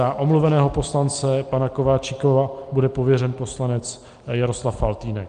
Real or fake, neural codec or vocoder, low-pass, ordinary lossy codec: real; none; 9.9 kHz; Opus, 32 kbps